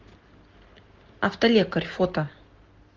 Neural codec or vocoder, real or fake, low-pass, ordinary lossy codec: none; real; 7.2 kHz; Opus, 16 kbps